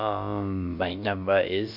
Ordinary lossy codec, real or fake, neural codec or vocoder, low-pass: none; fake; codec, 16 kHz, about 1 kbps, DyCAST, with the encoder's durations; 5.4 kHz